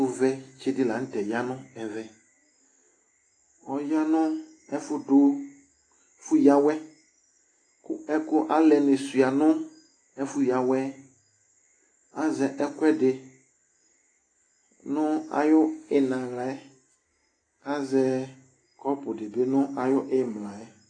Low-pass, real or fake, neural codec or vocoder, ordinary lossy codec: 9.9 kHz; real; none; AAC, 32 kbps